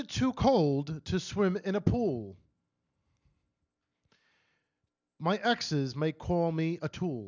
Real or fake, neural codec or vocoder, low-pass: real; none; 7.2 kHz